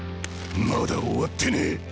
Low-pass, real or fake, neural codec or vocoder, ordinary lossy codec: none; real; none; none